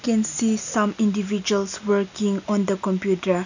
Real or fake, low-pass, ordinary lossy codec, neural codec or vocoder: real; 7.2 kHz; none; none